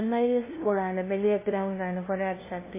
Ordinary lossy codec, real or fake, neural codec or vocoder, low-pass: MP3, 16 kbps; fake; codec, 16 kHz, 0.5 kbps, FunCodec, trained on LibriTTS, 25 frames a second; 3.6 kHz